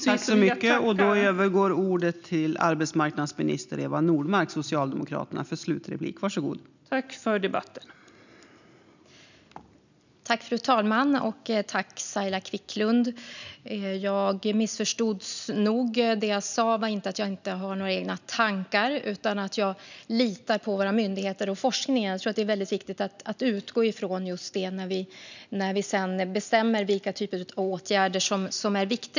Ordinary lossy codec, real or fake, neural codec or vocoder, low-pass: none; real; none; 7.2 kHz